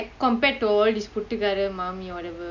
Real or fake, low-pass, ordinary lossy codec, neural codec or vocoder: real; 7.2 kHz; none; none